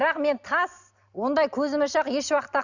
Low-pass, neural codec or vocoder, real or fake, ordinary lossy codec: 7.2 kHz; none; real; none